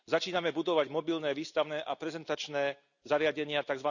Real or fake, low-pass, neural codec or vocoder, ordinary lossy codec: real; 7.2 kHz; none; none